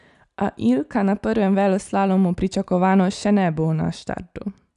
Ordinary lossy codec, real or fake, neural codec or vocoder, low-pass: none; real; none; 10.8 kHz